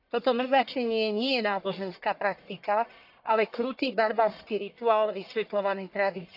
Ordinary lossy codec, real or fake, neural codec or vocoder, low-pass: AAC, 48 kbps; fake; codec, 44.1 kHz, 1.7 kbps, Pupu-Codec; 5.4 kHz